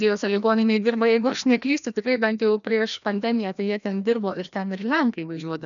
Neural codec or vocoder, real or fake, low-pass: codec, 16 kHz, 1 kbps, FreqCodec, larger model; fake; 7.2 kHz